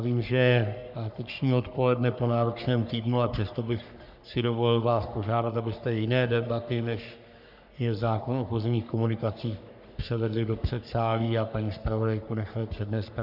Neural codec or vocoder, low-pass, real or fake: codec, 44.1 kHz, 3.4 kbps, Pupu-Codec; 5.4 kHz; fake